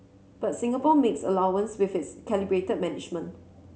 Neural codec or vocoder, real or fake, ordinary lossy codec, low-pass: none; real; none; none